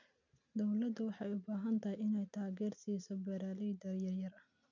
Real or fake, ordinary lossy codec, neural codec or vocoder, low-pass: real; none; none; 7.2 kHz